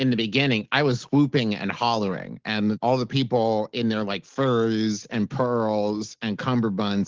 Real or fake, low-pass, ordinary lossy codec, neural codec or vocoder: real; 7.2 kHz; Opus, 16 kbps; none